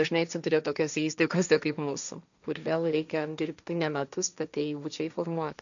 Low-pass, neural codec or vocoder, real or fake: 7.2 kHz; codec, 16 kHz, 1.1 kbps, Voila-Tokenizer; fake